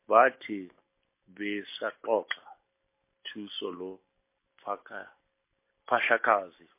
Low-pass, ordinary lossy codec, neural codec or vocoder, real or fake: 3.6 kHz; MP3, 24 kbps; none; real